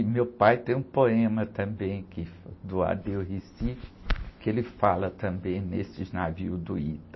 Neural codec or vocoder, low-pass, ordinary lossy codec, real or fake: none; 7.2 kHz; MP3, 24 kbps; real